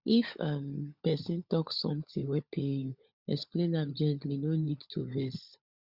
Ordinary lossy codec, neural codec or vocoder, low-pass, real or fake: Opus, 64 kbps; codec, 16 kHz, 16 kbps, FunCodec, trained on LibriTTS, 50 frames a second; 5.4 kHz; fake